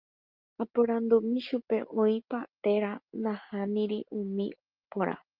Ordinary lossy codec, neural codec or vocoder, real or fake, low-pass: Opus, 24 kbps; none; real; 5.4 kHz